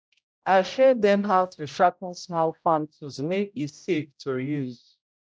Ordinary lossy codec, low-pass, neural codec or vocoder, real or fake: none; none; codec, 16 kHz, 0.5 kbps, X-Codec, HuBERT features, trained on general audio; fake